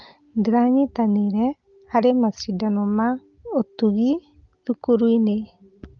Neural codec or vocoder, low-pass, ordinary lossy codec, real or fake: none; 7.2 kHz; Opus, 32 kbps; real